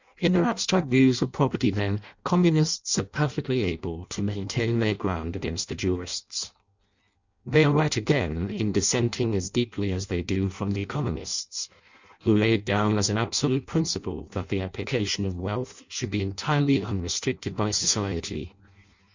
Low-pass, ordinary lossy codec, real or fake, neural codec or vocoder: 7.2 kHz; Opus, 64 kbps; fake; codec, 16 kHz in and 24 kHz out, 0.6 kbps, FireRedTTS-2 codec